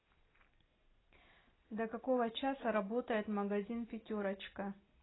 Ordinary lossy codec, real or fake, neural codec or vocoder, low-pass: AAC, 16 kbps; real; none; 7.2 kHz